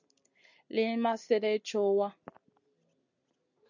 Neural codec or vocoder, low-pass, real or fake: none; 7.2 kHz; real